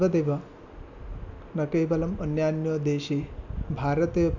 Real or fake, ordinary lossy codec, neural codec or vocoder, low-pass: real; none; none; 7.2 kHz